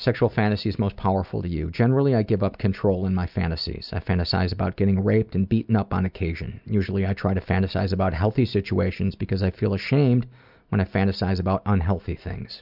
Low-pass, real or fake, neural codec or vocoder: 5.4 kHz; real; none